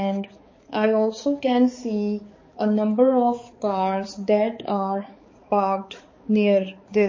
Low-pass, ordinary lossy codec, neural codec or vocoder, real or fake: 7.2 kHz; MP3, 32 kbps; codec, 16 kHz, 4 kbps, X-Codec, HuBERT features, trained on balanced general audio; fake